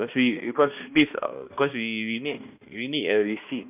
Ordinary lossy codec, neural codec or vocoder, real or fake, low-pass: none; codec, 16 kHz, 1 kbps, X-Codec, HuBERT features, trained on balanced general audio; fake; 3.6 kHz